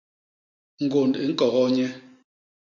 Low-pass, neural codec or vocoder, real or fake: 7.2 kHz; none; real